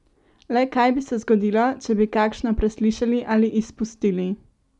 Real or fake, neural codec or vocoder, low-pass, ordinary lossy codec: real; none; 10.8 kHz; none